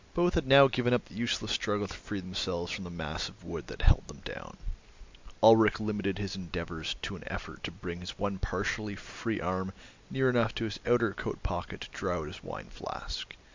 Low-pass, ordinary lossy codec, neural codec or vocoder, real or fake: 7.2 kHz; MP3, 64 kbps; none; real